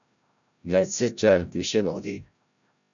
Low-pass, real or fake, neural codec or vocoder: 7.2 kHz; fake; codec, 16 kHz, 0.5 kbps, FreqCodec, larger model